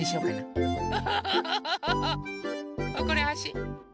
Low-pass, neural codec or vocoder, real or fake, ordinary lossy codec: none; none; real; none